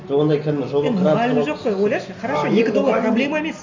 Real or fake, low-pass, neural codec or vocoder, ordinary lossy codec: real; 7.2 kHz; none; AAC, 48 kbps